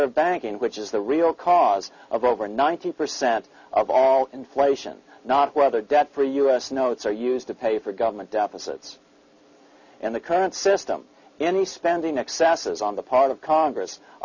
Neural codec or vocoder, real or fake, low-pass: none; real; 7.2 kHz